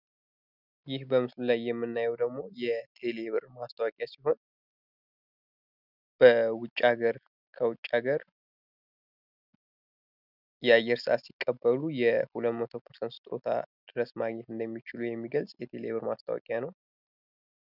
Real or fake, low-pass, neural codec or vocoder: real; 5.4 kHz; none